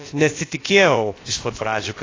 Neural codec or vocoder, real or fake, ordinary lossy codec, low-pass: codec, 16 kHz, about 1 kbps, DyCAST, with the encoder's durations; fake; AAC, 32 kbps; 7.2 kHz